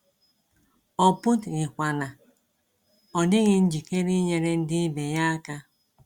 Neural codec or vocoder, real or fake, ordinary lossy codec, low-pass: none; real; Opus, 64 kbps; 19.8 kHz